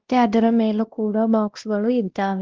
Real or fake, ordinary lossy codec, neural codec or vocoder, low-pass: fake; Opus, 16 kbps; codec, 16 kHz, 1 kbps, X-Codec, WavLM features, trained on Multilingual LibriSpeech; 7.2 kHz